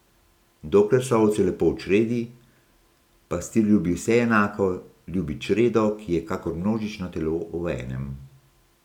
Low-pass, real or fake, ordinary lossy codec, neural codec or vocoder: 19.8 kHz; real; none; none